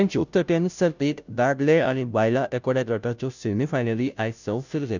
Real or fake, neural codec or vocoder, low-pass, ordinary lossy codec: fake; codec, 16 kHz, 0.5 kbps, FunCodec, trained on Chinese and English, 25 frames a second; 7.2 kHz; none